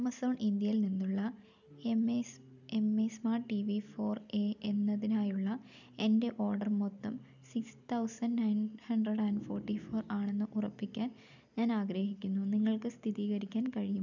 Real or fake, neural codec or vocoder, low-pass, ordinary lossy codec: real; none; 7.2 kHz; none